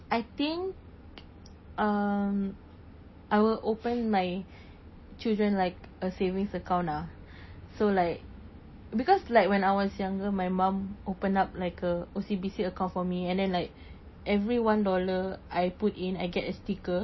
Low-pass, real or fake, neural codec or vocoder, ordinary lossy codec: 7.2 kHz; real; none; MP3, 24 kbps